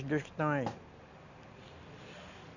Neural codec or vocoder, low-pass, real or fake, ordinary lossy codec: none; 7.2 kHz; real; none